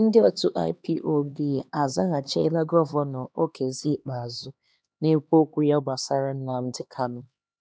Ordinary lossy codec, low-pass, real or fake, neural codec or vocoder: none; none; fake; codec, 16 kHz, 2 kbps, X-Codec, HuBERT features, trained on LibriSpeech